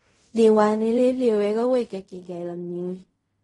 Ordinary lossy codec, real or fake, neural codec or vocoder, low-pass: AAC, 32 kbps; fake; codec, 16 kHz in and 24 kHz out, 0.4 kbps, LongCat-Audio-Codec, fine tuned four codebook decoder; 10.8 kHz